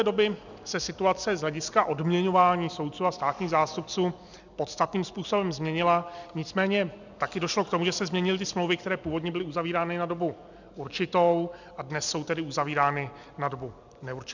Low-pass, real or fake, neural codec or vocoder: 7.2 kHz; real; none